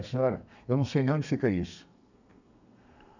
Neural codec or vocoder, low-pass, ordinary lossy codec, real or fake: codec, 32 kHz, 1.9 kbps, SNAC; 7.2 kHz; none; fake